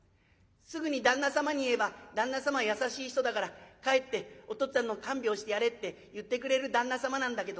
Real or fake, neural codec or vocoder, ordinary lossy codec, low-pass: real; none; none; none